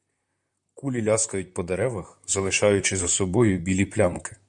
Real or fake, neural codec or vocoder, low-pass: fake; vocoder, 44.1 kHz, 128 mel bands, Pupu-Vocoder; 10.8 kHz